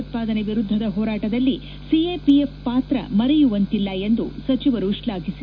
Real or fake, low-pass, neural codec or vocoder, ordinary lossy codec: real; 7.2 kHz; none; none